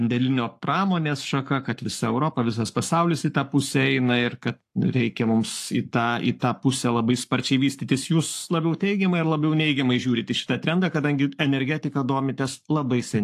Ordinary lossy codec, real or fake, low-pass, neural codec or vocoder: AAC, 64 kbps; fake; 14.4 kHz; codec, 44.1 kHz, 7.8 kbps, Pupu-Codec